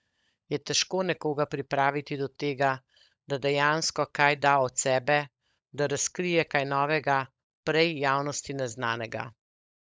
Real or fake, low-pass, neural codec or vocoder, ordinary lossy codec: fake; none; codec, 16 kHz, 16 kbps, FunCodec, trained on LibriTTS, 50 frames a second; none